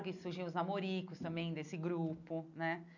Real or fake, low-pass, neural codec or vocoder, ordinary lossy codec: real; 7.2 kHz; none; none